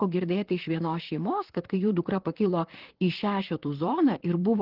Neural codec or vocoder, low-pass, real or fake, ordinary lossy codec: vocoder, 44.1 kHz, 128 mel bands, Pupu-Vocoder; 5.4 kHz; fake; Opus, 16 kbps